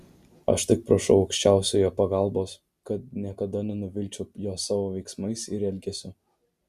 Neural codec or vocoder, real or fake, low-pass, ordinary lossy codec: none; real; 14.4 kHz; Opus, 64 kbps